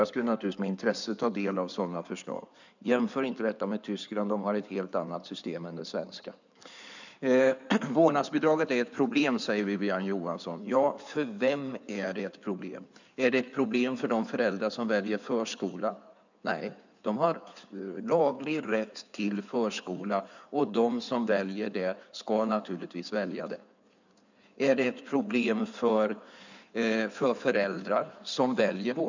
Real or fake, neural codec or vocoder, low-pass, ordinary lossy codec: fake; codec, 16 kHz in and 24 kHz out, 2.2 kbps, FireRedTTS-2 codec; 7.2 kHz; none